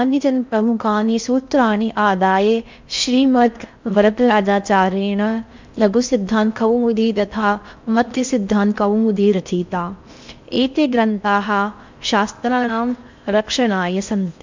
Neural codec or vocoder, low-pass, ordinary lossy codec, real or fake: codec, 16 kHz in and 24 kHz out, 0.6 kbps, FocalCodec, streaming, 4096 codes; 7.2 kHz; MP3, 64 kbps; fake